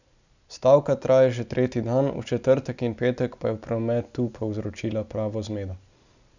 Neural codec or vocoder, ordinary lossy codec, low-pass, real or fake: none; none; 7.2 kHz; real